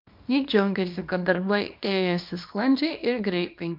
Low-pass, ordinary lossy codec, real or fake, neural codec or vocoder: 5.4 kHz; MP3, 48 kbps; fake; codec, 24 kHz, 0.9 kbps, WavTokenizer, small release